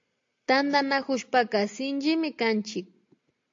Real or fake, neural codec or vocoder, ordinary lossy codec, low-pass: real; none; AAC, 48 kbps; 7.2 kHz